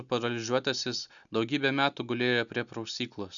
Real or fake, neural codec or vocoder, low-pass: real; none; 7.2 kHz